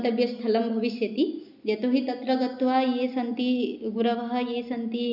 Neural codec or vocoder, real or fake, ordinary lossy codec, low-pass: none; real; none; 5.4 kHz